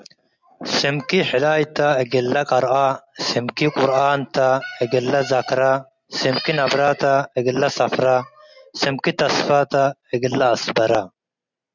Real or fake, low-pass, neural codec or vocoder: real; 7.2 kHz; none